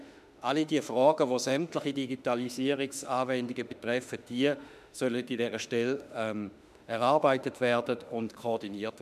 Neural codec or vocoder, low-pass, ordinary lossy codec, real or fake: autoencoder, 48 kHz, 32 numbers a frame, DAC-VAE, trained on Japanese speech; 14.4 kHz; none; fake